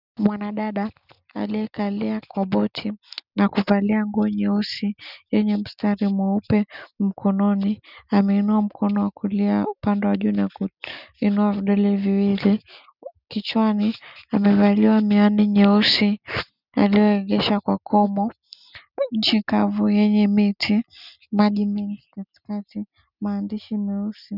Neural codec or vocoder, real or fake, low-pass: none; real; 5.4 kHz